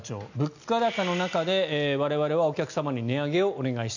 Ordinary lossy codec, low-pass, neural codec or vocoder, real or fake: none; 7.2 kHz; none; real